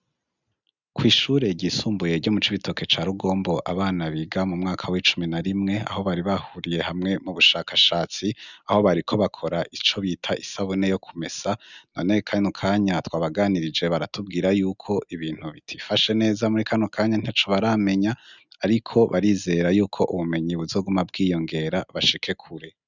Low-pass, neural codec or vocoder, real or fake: 7.2 kHz; none; real